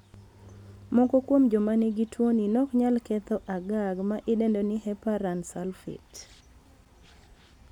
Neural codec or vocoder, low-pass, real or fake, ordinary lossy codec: none; 19.8 kHz; real; none